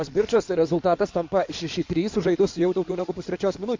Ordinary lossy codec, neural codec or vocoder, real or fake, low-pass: MP3, 48 kbps; codec, 16 kHz in and 24 kHz out, 2.2 kbps, FireRedTTS-2 codec; fake; 7.2 kHz